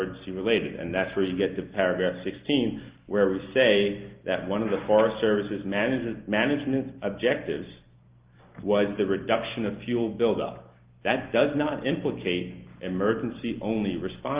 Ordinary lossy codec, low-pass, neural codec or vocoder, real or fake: Opus, 32 kbps; 3.6 kHz; none; real